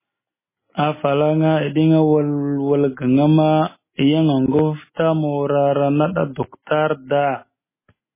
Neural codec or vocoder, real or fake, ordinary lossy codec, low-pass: none; real; MP3, 16 kbps; 3.6 kHz